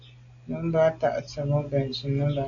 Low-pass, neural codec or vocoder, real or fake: 7.2 kHz; none; real